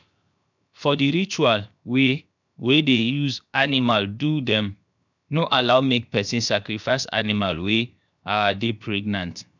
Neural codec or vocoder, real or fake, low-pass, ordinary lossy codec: codec, 16 kHz, 0.7 kbps, FocalCodec; fake; 7.2 kHz; none